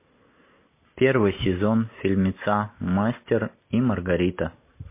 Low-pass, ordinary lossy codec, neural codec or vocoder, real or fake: 3.6 kHz; MP3, 24 kbps; none; real